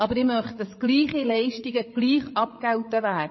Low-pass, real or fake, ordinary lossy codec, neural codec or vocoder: 7.2 kHz; fake; MP3, 24 kbps; codec, 16 kHz, 8 kbps, FreqCodec, larger model